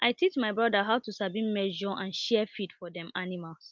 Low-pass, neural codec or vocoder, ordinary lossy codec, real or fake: 7.2 kHz; none; Opus, 24 kbps; real